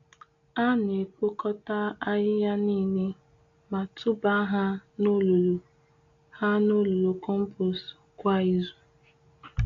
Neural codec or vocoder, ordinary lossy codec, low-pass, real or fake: none; AAC, 48 kbps; 7.2 kHz; real